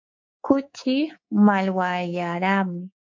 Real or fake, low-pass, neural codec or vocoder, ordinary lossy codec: fake; 7.2 kHz; codec, 24 kHz, 3.1 kbps, DualCodec; MP3, 48 kbps